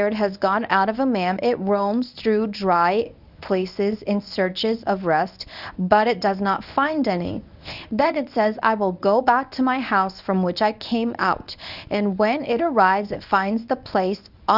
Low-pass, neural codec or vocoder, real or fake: 5.4 kHz; codec, 24 kHz, 0.9 kbps, WavTokenizer, medium speech release version 1; fake